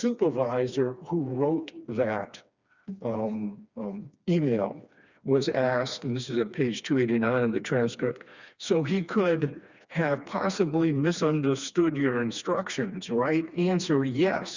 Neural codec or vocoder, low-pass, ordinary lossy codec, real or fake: codec, 16 kHz, 2 kbps, FreqCodec, smaller model; 7.2 kHz; Opus, 64 kbps; fake